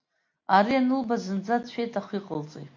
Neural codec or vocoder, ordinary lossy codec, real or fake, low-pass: none; MP3, 48 kbps; real; 7.2 kHz